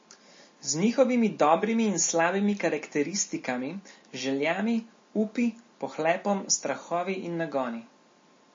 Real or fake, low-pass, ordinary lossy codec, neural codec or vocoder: real; 7.2 kHz; MP3, 32 kbps; none